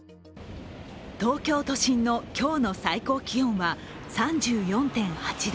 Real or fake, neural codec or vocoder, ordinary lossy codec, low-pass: real; none; none; none